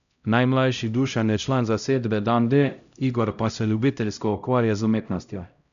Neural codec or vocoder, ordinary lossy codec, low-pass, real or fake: codec, 16 kHz, 0.5 kbps, X-Codec, HuBERT features, trained on LibriSpeech; none; 7.2 kHz; fake